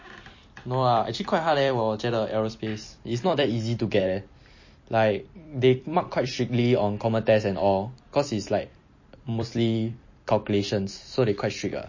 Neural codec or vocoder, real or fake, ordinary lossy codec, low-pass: vocoder, 44.1 kHz, 128 mel bands every 256 samples, BigVGAN v2; fake; MP3, 32 kbps; 7.2 kHz